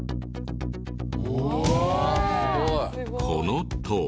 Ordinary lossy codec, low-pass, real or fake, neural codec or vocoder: none; none; real; none